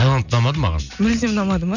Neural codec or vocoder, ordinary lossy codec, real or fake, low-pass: none; none; real; 7.2 kHz